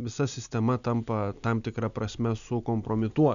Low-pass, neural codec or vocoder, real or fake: 7.2 kHz; none; real